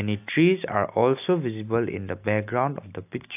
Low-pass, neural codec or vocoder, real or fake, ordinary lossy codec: 3.6 kHz; none; real; AAC, 32 kbps